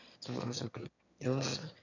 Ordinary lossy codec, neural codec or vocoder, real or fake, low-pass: none; autoencoder, 22.05 kHz, a latent of 192 numbers a frame, VITS, trained on one speaker; fake; 7.2 kHz